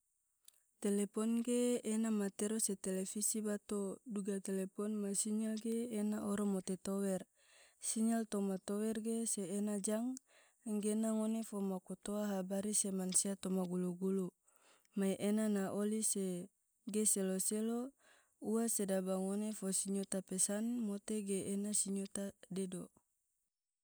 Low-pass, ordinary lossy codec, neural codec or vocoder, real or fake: none; none; none; real